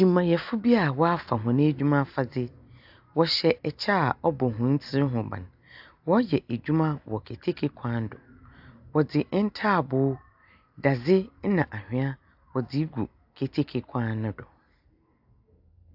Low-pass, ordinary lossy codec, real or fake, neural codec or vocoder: 5.4 kHz; AAC, 48 kbps; real; none